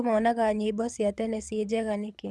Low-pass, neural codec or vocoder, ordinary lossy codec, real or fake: none; codec, 24 kHz, 6 kbps, HILCodec; none; fake